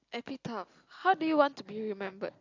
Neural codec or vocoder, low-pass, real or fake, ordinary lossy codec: none; 7.2 kHz; real; AAC, 48 kbps